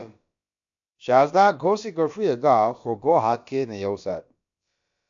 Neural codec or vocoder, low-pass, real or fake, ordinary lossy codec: codec, 16 kHz, about 1 kbps, DyCAST, with the encoder's durations; 7.2 kHz; fake; AAC, 64 kbps